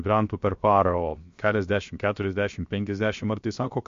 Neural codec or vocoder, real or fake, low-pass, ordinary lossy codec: codec, 16 kHz, 0.7 kbps, FocalCodec; fake; 7.2 kHz; MP3, 48 kbps